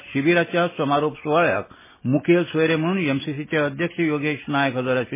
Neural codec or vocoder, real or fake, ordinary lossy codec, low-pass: none; real; MP3, 16 kbps; 3.6 kHz